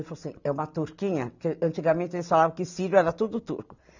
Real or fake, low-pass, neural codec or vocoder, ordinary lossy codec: real; 7.2 kHz; none; none